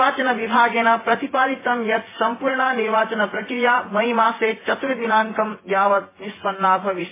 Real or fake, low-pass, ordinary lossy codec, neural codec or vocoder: fake; 3.6 kHz; MP3, 16 kbps; vocoder, 24 kHz, 100 mel bands, Vocos